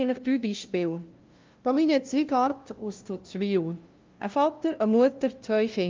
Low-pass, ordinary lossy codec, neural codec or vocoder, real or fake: 7.2 kHz; Opus, 24 kbps; codec, 16 kHz, 0.5 kbps, FunCodec, trained on LibriTTS, 25 frames a second; fake